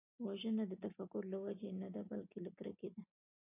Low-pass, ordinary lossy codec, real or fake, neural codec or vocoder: 3.6 kHz; AAC, 32 kbps; real; none